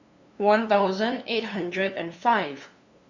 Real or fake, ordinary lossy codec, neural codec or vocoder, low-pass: fake; Opus, 64 kbps; codec, 16 kHz, 2 kbps, FunCodec, trained on LibriTTS, 25 frames a second; 7.2 kHz